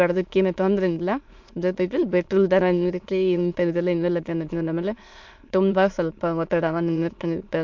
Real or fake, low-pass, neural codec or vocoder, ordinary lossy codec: fake; 7.2 kHz; autoencoder, 22.05 kHz, a latent of 192 numbers a frame, VITS, trained on many speakers; MP3, 48 kbps